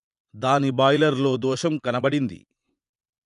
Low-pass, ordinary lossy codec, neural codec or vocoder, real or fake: 10.8 kHz; none; vocoder, 24 kHz, 100 mel bands, Vocos; fake